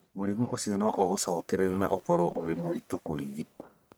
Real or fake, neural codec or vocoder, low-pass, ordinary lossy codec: fake; codec, 44.1 kHz, 1.7 kbps, Pupu-Codec; none; none